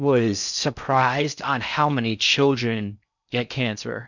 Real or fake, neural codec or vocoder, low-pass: fake; codec, 16 kHz in and 24 kHz out, 0.6 kbps, FocalCodec, streaming, 4096 codes; 7.2 kHz